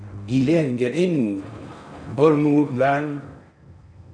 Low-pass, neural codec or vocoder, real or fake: 9.9 kHz; codec, 16 kHz in and 24 kHz out, 0.6 kbps, FocalCodec, streaming, 4096 codes; fake